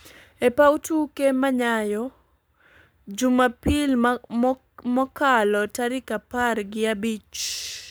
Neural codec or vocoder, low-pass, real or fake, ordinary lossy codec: codec, 44.1 kHz, 7.8 kbps, Pupu-Codec; none; fake; none